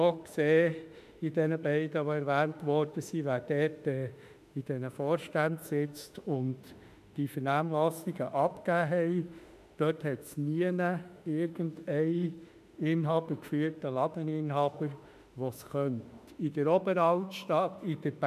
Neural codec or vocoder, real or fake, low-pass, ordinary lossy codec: autoencoder, 48 kHz, 32 numbers a frame, DAC-VAE, trained on Japanese speech; fake; 14.4 kHz; none